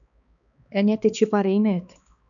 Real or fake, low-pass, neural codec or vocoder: fake; 7.2 kHz; codec, 16 kHz, 2 kbps, X-Codec, HuBERT features, trained on balanced general audio